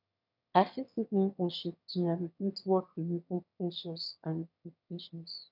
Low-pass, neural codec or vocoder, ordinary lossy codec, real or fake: 5.4 kHz; autoencoder, 22.05 kHz, a latent of 192 numbers a frame, VITS, trained on one speaker; none; fake